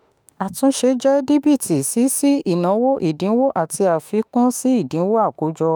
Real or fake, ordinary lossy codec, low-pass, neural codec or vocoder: fake; none; none; autoencoder, 48 kHz, 32 numbers a frame, DAC-VAE, trained on Japanese speech